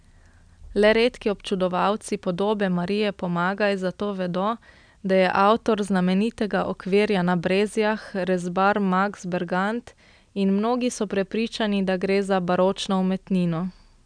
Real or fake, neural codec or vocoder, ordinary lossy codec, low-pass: real; none; none; 9.9 kHz